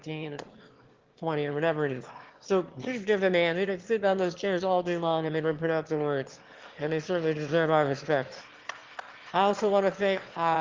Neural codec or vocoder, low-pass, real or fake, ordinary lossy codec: autoencoder, 22.05 kHz, a latent of 192 numbers a frame, VITS, trained on one speaker; 7.2 kHz; fake; Opus, 16 kbps